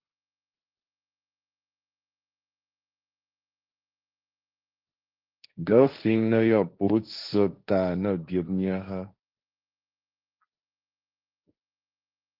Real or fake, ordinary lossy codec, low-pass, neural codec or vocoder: fake; Opus, 32 kbps; 5.4 kHz; codec, 16 kHz, 1.1 kbps, Voila-Tokenizer